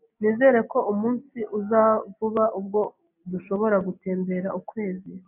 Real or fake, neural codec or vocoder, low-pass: real; none; 3.6 kHz